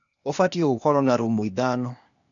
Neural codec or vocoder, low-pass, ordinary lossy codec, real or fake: codec, 16 kHz, 0.8 kbps, ZipCodec; 7.2 kHz; none; fake